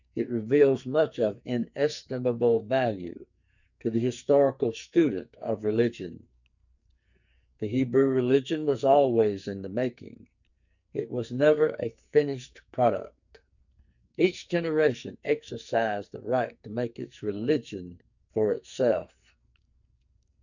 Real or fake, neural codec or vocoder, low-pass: fake; codec, 44.1 kHz, 2.6 kbps, SNAC; 7.2 kHz